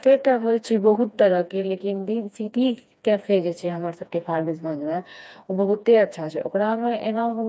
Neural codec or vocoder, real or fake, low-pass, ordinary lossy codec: codec, 16 kHz, 2 kbps, FreqCodec, smaller model; fake; none; none